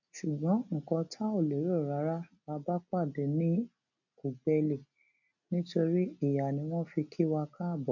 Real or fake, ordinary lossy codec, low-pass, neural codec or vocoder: real; none; 7.2 kHz; none